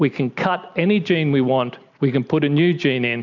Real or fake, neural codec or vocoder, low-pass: real; none; 7.2 kHz